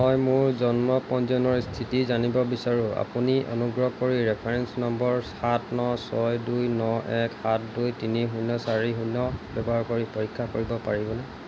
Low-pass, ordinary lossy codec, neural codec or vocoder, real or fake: none; none; none; real